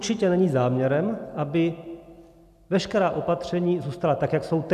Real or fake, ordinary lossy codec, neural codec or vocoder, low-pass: real; MP3, 96 kbps; none; 14.4 kHz